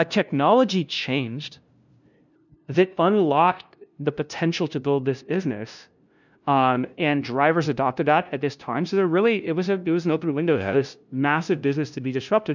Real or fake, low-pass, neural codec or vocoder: fake; 7.2 kHz; codec, 16 kHz, 0.5 kbps, FunCodec, trained on LibriTTS, 25 frames a second